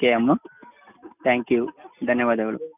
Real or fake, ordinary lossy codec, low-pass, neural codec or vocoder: real; none; 3.6 kHz; none